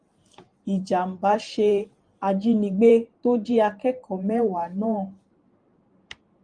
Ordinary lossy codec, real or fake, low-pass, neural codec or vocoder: Opus, 32 kbps; fake; 9.9 kHz; vocoder, 44.1 kHz, 128 mel bands every 512 samples, BigVGAN v2